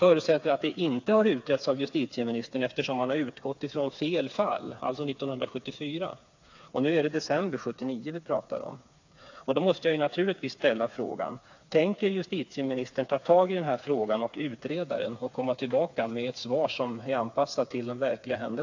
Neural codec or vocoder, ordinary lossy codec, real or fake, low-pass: codec, 16 kHz, 4 kbps, FreqCodec, smaller model; AAC, 48 kbps; fake; 7.2 kHz